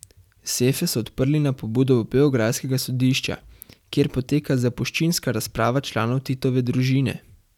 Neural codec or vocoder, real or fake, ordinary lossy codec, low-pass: vocoder, 44.1 kHz, 128 mel bands every 256 samples, BigVGAN v2; fake; none; 19.8 kHz